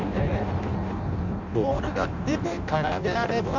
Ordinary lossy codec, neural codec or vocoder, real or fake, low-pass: none; codec, 16 kHz in and 24 kHz out, 0.6 kbps, FireRedTTS-2 codec; fake; 7.2 kHz